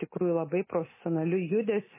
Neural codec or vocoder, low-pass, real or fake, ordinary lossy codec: none; 3.6 kHz; real; MP3, 16 kbps